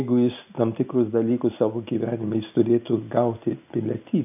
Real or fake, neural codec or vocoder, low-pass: real; none; 3.6 kHz